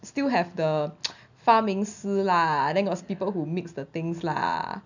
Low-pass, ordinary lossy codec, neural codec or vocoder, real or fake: 7.2 kHz; none; none; real